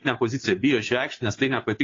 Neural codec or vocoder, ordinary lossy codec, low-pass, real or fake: codec, 16 kHz, 2 kbps, FunCodec, trained on Chinese and English, 25 frames a second; AAC, 32 kbps; 7.2 kHz; fake